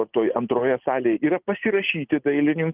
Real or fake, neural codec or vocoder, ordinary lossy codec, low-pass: real; none; Opus, 24 kbps; 3.6 kHz